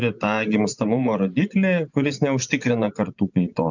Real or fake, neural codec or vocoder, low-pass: fake; vocoder, 24 kHz, 100 mel bands, Vocos; 7.2 kHz